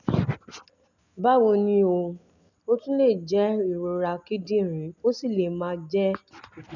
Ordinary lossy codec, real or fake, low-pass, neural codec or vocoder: none; real; 7.2 kHz; none